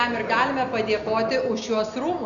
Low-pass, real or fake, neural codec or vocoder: 7.2 kHz; real; none